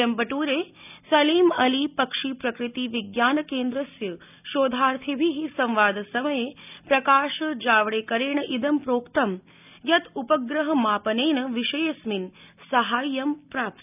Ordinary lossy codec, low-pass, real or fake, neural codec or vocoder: none; 3.6 kHz; real; none